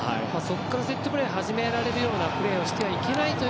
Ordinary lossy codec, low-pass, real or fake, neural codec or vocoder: none; none; real; none